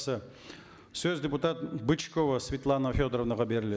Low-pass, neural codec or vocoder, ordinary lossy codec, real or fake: none; none; none; real